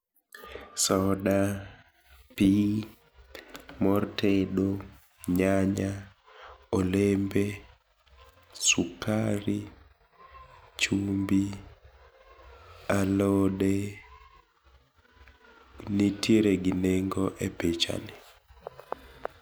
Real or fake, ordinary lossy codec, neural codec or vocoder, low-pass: real; none; none; none